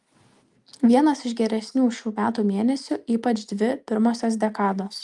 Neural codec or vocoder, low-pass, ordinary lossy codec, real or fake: none; 10.8 kHz; Opus, 32 kbps; real